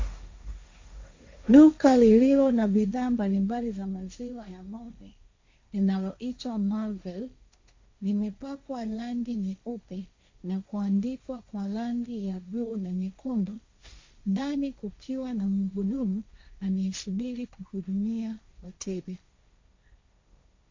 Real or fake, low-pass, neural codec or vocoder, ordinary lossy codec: fake; 7.2 kHz; codec, 16 kHz, 1.1 kbps, Voila-Tokenizer; MP3, 48 kbps